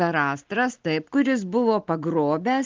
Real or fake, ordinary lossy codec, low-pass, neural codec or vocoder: real; Opus, 16 kbps; 7.2 kHz; none